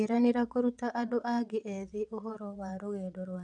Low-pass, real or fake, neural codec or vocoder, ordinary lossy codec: 9.9 kHz; fake; vocoder, 22.05 kHz, 80 mel bands, WaveNeXt; none